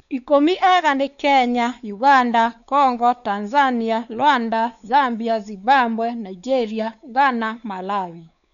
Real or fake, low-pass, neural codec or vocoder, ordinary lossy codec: fake; 7.2 kHz; codec, 16 kHz, 4 kbps, X-Codec, WavLM features, trained on Multilingual LibriSpeech; none